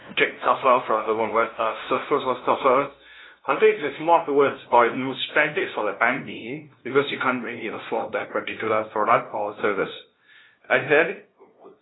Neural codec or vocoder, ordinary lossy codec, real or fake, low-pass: codec, 16 kHz, 0.5 kbps, FunCodec, trained on LibriTTS, 25 frames a second; AAC, 16 kbps; fake; 7.2 kHz